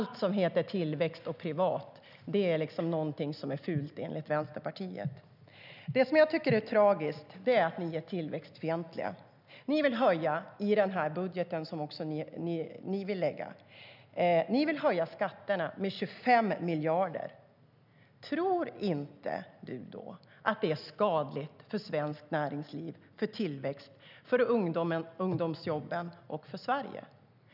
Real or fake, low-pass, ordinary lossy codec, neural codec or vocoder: real; 5.4 kHz; none; none